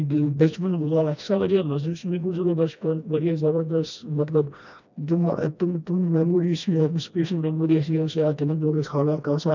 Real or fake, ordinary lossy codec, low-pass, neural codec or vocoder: fake; none; 7.2 kHz; codec, 16 kHz, 1 kbps, FreqCodec, smaller model